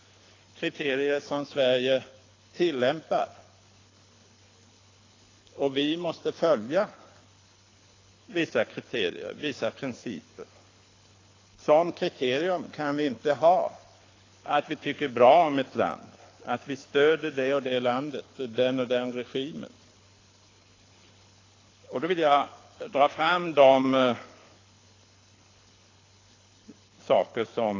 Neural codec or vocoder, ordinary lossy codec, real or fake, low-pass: codec, 24 kHz, 6 kbps, HILCodec; AAC, 32 kbps; fake; 7.2 kHz